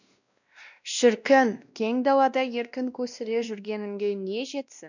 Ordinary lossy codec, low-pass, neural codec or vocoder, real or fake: none; 7.2 kHz; codec, 16 kHz, 1 kbps, X-Codec, WavLM features, trained on Multilingual LibriSpeech; fake